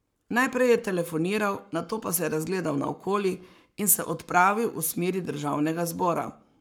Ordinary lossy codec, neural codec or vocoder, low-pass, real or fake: none; codec, 44.1 kHz, 7.8 kbps, Pupu-Codec; none; fake